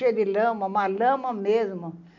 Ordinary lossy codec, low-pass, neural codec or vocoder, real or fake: none; 7.2 kHz; none; real